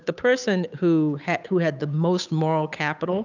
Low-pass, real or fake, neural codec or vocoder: 7.2 kHz; fake; codec, 16 kHz, 8 kbps, FunCodec, trained on Chinese and English, 25 frames a second